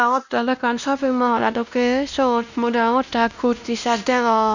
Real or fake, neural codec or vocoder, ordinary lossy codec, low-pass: fake; codec, 16 kHz, 1 kbps, X-Codec, WavLM features, trained on Multilingual LibriSpeech; none; 7.2 kHz